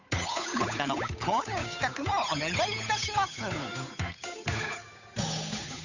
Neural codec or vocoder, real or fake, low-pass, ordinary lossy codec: codec, 16 kHz, 8 kbps, FunCodec, trained on Chinese and English, 25 frames a second; fake; 7.2 kHz; none